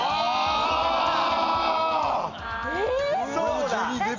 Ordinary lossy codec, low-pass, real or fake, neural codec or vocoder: none; 7.2 kHz; real; none